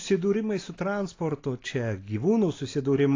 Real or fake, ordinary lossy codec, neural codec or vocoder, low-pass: real; AAC, 32 kbps; none; 7.2 kHz